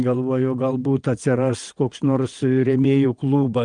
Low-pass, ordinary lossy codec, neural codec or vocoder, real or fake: 9.9 kHz; Opus, 32 kbps; vocoder, 22.05 kHz, 80 mel bands, WaveNeXt; fake